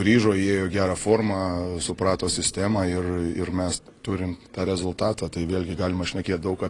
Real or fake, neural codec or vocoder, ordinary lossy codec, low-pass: real; none; AAC, 32 kbps; 10.8 kHz